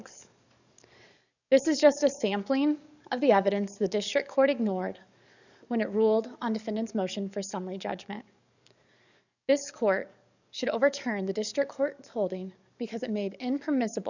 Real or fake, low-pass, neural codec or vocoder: fake; 7.2 kHz; codec, 44.1 kHz, 7.8 kbps, DAC